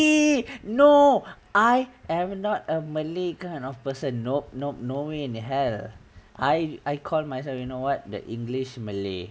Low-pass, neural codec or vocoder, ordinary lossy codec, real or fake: none; none; none; real